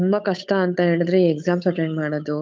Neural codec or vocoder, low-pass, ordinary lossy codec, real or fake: codec, 16 kHz, 8 kbps, FunCodec, trained on Chinese and English, 25 frames a second; none; none; fake